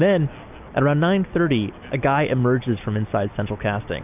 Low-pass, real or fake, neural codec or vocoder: 3.6 kHz; real; none